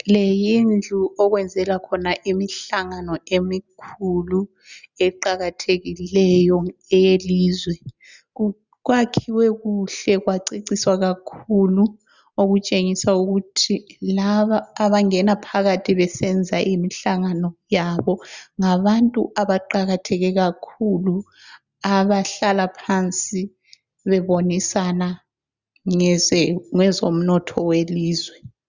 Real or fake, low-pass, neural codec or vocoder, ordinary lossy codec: real; 7.2 kHz; none; Opus, 64 kbps